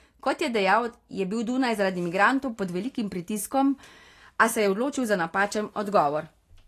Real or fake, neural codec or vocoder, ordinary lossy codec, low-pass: real; none; AAC, 48 kbps; 14.4 kHz